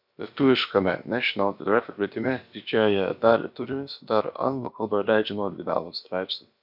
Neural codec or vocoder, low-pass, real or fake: codec, 16 kHz, about 1 kbps, DyCAST, with the encoder's durations; 5.4 kHz; fake